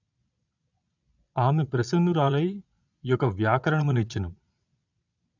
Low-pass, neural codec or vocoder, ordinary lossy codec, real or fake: 7.2 kHz; vocoder, 22.05 kHz, 80 mel bands, Vocos; none; fake